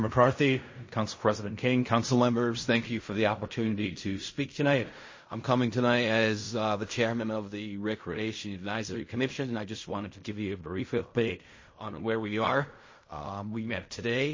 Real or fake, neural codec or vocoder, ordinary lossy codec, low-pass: fake; codec, 16 kHz in and 24 kHz out, 0.4 kbps, LongCat-Audio-Codec, fine tuned four codebook decoder; MP3, 32 kbps; 7.2 kHz